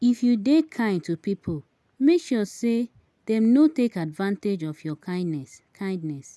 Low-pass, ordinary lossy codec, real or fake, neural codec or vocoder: none; none; real; none